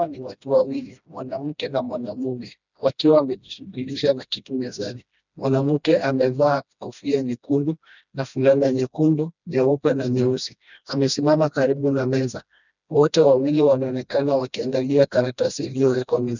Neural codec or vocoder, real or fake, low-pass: codec, 16 kHz, 1 kbps, FreqCodec, smaller model; fake; 7.2 kHz